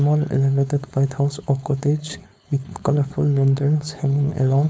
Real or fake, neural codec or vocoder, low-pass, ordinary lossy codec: fake; codec, 16 kHz, 4.8 kbps, FACodec; none; none